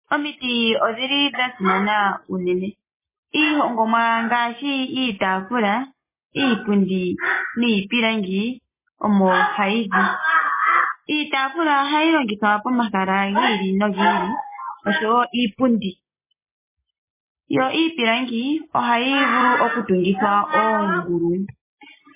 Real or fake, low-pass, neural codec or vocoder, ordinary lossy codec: real; 3.6 kHz; none; MP3, 16 kbps